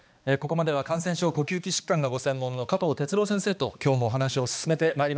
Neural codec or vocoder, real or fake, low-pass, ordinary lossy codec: codec, 16 kHz, 2 kbps, X-Codec, HuBERT features, trained on balanced general audio; fake; none; none